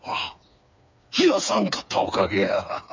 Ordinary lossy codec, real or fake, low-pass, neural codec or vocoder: MP3, 64 kbps; fake; 7.2 kHz; codec, 16 kHz, 4 kbps, FreqCodec, smaller model